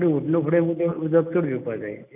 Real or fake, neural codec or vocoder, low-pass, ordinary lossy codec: real; none; 3.6 kHz; none